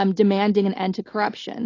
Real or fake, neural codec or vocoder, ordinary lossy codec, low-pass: real; none; AAC, 32 kbps; 7.2 kHz